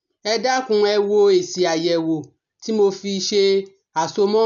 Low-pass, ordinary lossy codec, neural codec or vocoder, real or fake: 7.2 kHz; none; none; real